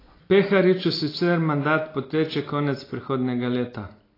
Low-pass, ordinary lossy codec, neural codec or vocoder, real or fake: 5.4 kHz; AAC, 24 kbps; none; real